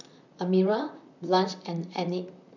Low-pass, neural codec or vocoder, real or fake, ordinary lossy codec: 7.2 kHz; vocoder, 44.1 kHz, 128 mel bands, Pupu-Vocoder; fake; none